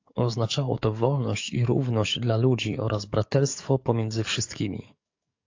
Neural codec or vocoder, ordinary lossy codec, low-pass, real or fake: codec, 44.1 kHz, 7.8 kbps, DAC; AAC, 48 kbps; 7.2 kHz; fake